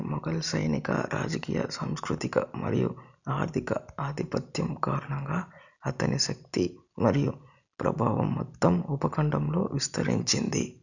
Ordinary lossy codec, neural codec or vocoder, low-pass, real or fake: none; none; 7.2 kHz; real